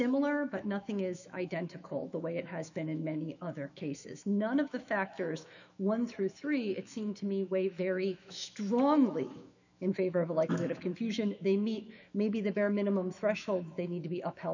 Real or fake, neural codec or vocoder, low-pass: fake; autoencoder, 48 kHz, 128 numbers a frame, DAC-VAE, trained on Japanese speech; 7.2 kHz